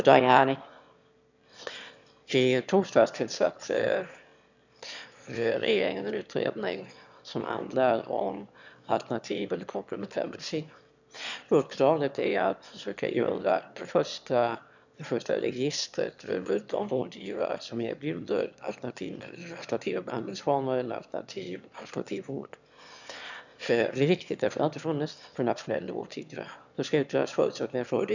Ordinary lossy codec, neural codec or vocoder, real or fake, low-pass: none; autoencoder, 22.05 kHz, a latent of 192 numbers a frame, VITS, trained on one speaker; fake; 7.2 kHz